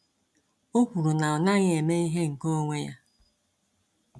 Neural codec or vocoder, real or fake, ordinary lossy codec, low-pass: none; real; none; none